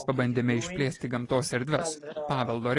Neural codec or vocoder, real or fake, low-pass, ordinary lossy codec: none; real; 10.8 kHz; AAC, 32 kbps